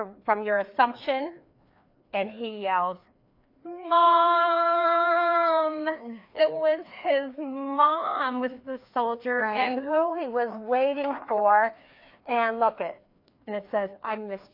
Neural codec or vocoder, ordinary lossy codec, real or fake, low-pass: codec, 16 kHz, 2 kbps, FreqCodec, larger model; AAC, 32 kbps; fake; 5.4 kHz